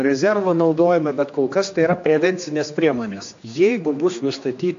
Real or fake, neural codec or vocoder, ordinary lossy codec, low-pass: fake; codec, 16 kHz, 2 kbps, X-Codec, HuBERT features, trained on general audio; AAC, 64 kbps; 7.2 kHz